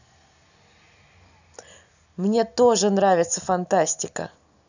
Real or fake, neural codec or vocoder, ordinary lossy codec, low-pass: real; none; none; 7.2 kHz